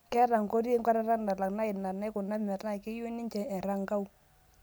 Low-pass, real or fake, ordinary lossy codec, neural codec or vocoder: none; real; none; none